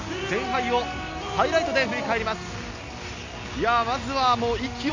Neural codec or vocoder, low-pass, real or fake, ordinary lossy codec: none; 7.2 kHz; real; MP3, 48 kbps